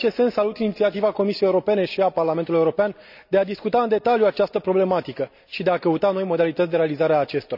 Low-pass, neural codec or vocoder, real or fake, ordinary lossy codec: 5.4 kHz; none; real; none